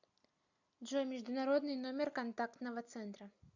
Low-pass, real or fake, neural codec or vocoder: 7.2 kHz; real; none